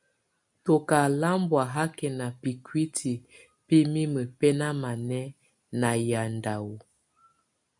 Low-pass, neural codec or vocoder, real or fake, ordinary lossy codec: 10.8 kHz; none; real; MP3, 96 kbps